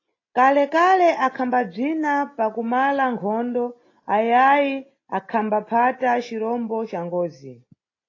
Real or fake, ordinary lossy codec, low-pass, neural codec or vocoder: real; AAC, 32 kbps; 7.2 kHz; none